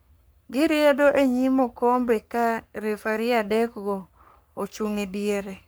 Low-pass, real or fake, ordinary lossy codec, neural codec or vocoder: none; fake; none; codec, 44.1 kHz, 3.4 kbps, Pupu-Codec